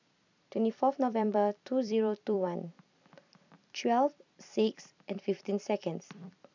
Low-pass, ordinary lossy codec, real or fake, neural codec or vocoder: 7.2 kHz; none; fake; vocoder, 44.1 kHz, 128 mel bands every 256 samples, BigVGAN v2